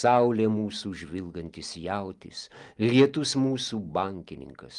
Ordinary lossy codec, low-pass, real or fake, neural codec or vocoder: Opus, 32 kbps; 10.8 kHz; real; none